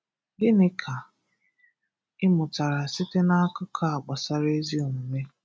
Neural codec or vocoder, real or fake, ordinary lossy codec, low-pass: none; real; none; none